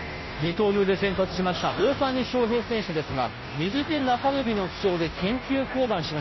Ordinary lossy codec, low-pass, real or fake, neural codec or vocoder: MP3, 24 kbps; 7.2 kHz; fake; codec, 16 kHz, 0.5 kbps, FunCodec, trained on Chinese and English, 25 frames a second